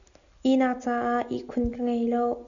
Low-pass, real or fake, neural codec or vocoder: 7.2 kHz; real; none